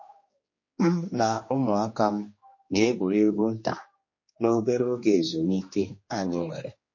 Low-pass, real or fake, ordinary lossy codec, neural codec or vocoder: 7.2 kHz; fake; MP3, 32 kbps; codec, 16 kHz, 2 kbps, X-Codec, HuBERT features, trained on general audio